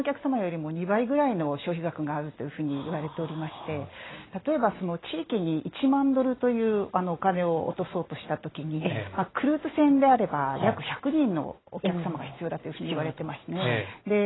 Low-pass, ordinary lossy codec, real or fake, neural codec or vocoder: 7.2 kHz; AAC, 16 kbps; real; none